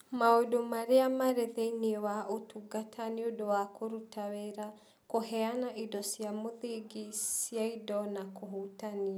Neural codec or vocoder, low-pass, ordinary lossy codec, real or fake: none; none; none; real